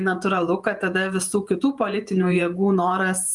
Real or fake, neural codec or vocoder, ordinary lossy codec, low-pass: fake; vocoder, 48 kHz, 128 mel bands, Vocos; Opus, 32 kbps; 10.8 kHz